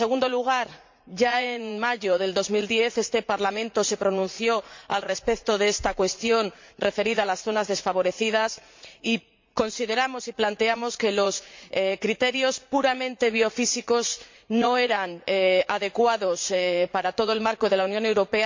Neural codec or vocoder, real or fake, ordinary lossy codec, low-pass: vocoder, 44.1 kHz, 80 mel bands, Vocos; fake; MP3, 48 kbps; 7.2 kHz